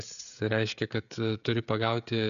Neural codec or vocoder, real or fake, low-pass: codec, 16 kHz, 16 kbps, FreqCodec, smaller model; fake; 7.2 kHz